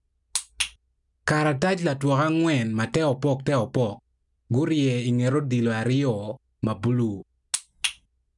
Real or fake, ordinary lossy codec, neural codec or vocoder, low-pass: real; none; none; 10.8 kHz